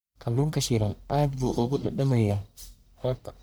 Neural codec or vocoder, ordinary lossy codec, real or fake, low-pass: codec, 44.1 kHz, 1.7 kbps, Pupu-Codec; none; fake; none